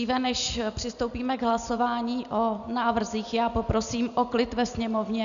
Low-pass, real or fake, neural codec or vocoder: 7.2 kHz; real; none